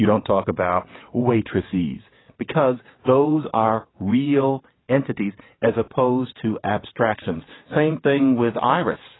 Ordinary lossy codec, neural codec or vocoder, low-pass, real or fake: AAC, 16 kbps; vocoder, 22.05 kHz, 80 mel bands, WaveNeXt; 7.2 kHz; fake